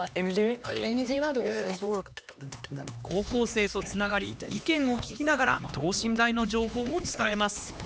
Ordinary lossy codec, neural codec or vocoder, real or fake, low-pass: none; codec, 16 kHz, 2 kbps, X-Codec, HuBERT features, trained on LibriSpeech; fake; none